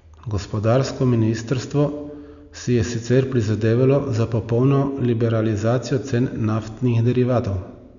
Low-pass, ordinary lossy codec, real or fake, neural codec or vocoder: 7.2 kHz; none; real; none